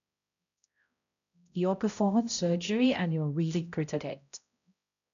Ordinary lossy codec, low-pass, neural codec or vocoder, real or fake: none; 7.2 kHz; codec, 16 kHz, 0.5 kbps, X-Codec, HuBERT features, trained on balanced general audio; fake